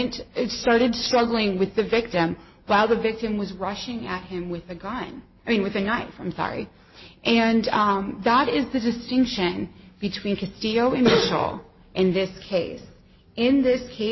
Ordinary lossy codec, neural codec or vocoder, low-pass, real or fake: MP3, 24 kbps; none; 7.2 kHz; real